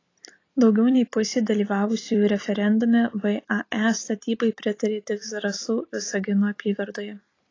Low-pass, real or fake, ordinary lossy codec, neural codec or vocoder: 7.2 kHz; fake; AAC, 32 kbps; vocoder, 44.1 kHz, 128 mel bands every 256 samples, BigVGAN v2